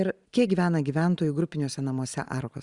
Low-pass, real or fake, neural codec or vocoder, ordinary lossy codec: 10.8 kHz; fake; vocoder, 44.1 kHz, 128 mel bands every 512 samples, BigVGAN v2; Opus, 64 kbps